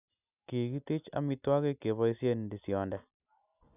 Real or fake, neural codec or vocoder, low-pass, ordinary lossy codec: real; none; 3.6 kHz; none